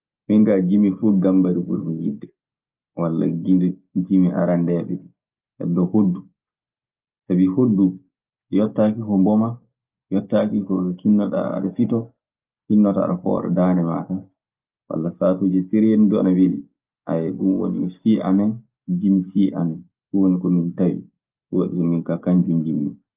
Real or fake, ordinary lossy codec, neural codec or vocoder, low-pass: real; Opus, 24 kbps; none; 3.6 kHz